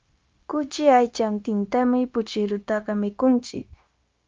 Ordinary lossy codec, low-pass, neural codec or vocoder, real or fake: Opus, 32 kbps; 7.2 kHz; codec, 16 kHz, 0.9 kbps, LongCat-Audio-Codec; fake